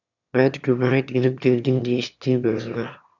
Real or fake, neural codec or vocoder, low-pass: fake; autoencoder, 22.05 kHz, a latent of 192 numbers a frame, VITS, trained on one speaker; 7.2 kHz